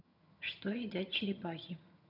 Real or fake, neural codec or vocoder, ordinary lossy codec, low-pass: fake; vocoder, 22.05 kHz, 80 mel bands, HiFi-GAN; none; 5.4 kHz